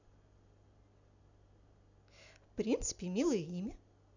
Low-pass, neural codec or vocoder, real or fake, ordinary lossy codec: 7.2 kHz; none; real; none